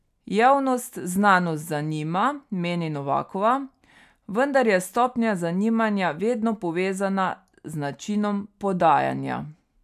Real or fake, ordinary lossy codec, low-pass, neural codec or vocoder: real; none; 14.4 kHz; none